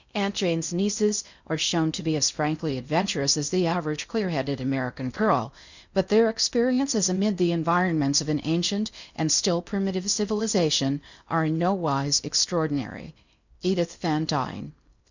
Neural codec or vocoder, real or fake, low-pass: codec, 16 kHz in and 24 kHz out, 0.6 kbps, FocalCodec, streaming, 2048 codes; fake; 7.2 kHz